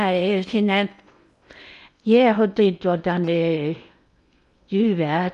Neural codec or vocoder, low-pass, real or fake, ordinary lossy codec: codec, 16 kHz in and 24 kHz out, 0.8 kbps, FocalCodec, streaming, 65536 codes; 10.8 kHz; fake; none